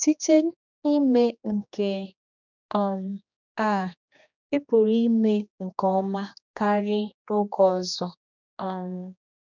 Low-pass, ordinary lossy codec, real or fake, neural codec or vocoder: 7.2 kHz; none; fake; codec, 16 kHz, 2 kbps, X-Codec, HuBERT features, trained on general audio